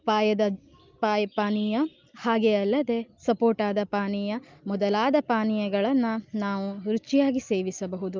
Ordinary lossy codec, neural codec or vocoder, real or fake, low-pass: Opus, 24 kbps; none; real; 7.2 kHz